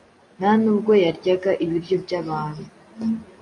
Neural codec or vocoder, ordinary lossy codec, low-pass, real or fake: none; AAC, 48 kbps; 10.8 kHz; real